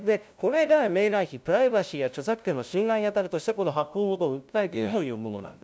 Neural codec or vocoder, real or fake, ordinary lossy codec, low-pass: codec, 16 kHz, 0.5 kbps, FunCodec, trained on LibriTTS, 25 frames a second; fake; none; none